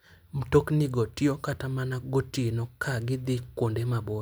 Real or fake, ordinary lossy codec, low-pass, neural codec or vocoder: real; none; none; none